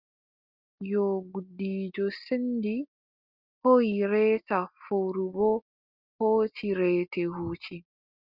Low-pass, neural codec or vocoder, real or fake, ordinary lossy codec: 5.4 kHz; none; real; Opus, 32 kbps